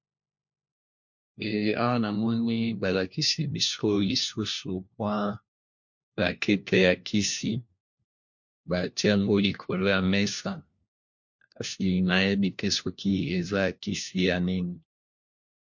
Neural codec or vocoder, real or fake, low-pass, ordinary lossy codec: codec, 16 kHz, 1 kbps, FunCodec, trained on LibriTTS, 50 frames a second; fake; 7.2 kHz; MP3, 48 kbps